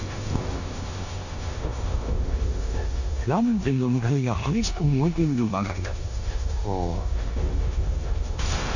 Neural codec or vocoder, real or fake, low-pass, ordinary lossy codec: codec, 16 kHz in and 24 kHz out, 0.9 kbps, LongCat-Audio-Codec, four codebook decoder; fake; 7.2 kHz; none